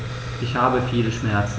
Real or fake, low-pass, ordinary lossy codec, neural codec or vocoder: real; none; none; none